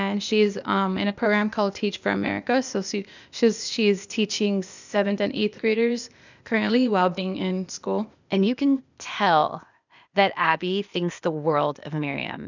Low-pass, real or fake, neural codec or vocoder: 7.2 kHz; fake; codec, 16 kHz, 0.8 kbps, ZipCodec